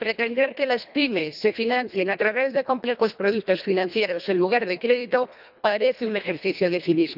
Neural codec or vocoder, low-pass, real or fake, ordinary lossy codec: codec, 24 kHz, 1.5 kbps, HILCodec; 5.4 kHz; fake; none